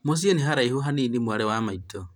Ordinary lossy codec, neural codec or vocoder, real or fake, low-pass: none; vocoder, 44.1 kHz, 128 mel bands every 512 samples, BigVGAN v2; fake; 19.8 kHz